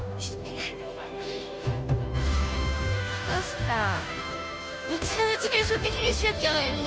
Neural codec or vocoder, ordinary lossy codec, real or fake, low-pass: codec, 16 kHz, 0.5 kbps, FunCodec, trained on Chinese and English, 25 frames a second; none; fake; none